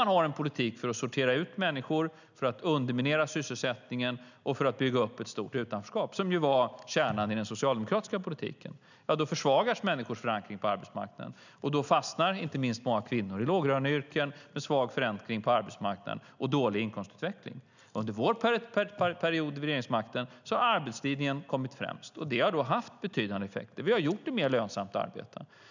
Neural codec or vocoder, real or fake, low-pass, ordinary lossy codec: none; real; 7.2 kHz; none